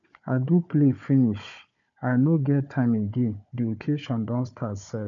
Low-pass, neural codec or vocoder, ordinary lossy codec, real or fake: 7.2 kHz; codec, 16 kHz, 4 kbps, FunCodec, trained on Chinese and English, 50 frames a second; none; fake